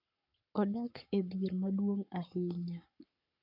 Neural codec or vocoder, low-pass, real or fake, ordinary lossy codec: codec, 44.1 kHz, 7.8 kbps, Pupu-Codec; 5.4 kHz; fake; none